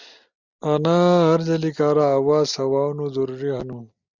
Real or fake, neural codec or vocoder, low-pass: real; none; 7.2 kHz